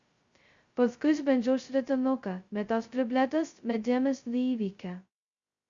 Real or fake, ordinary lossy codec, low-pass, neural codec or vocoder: fake; Opus, 64 kbps; 7.2 kHz; codec, 16 kHz, 0.2 kbps, FocalCodec